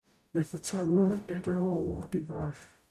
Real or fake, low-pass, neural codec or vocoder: fake; 14.4 kHz; codec, 44.1 kHz, 0.9 kbps, DAC